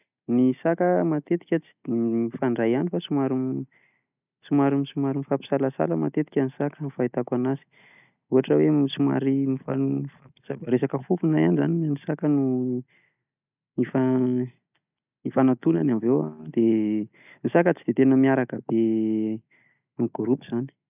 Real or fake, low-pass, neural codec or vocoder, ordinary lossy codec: real; 3.6 kHz; none; none